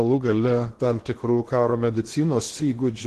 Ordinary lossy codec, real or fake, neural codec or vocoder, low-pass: Opus, 16 kbps; fake; codec, 16 kHz in and 24 kHz out, 0.8 kbps, FocalCodec, streaming, 65536 codes; 10.8 kHz